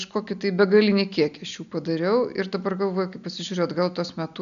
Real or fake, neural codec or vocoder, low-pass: real; none; 7.2 kHz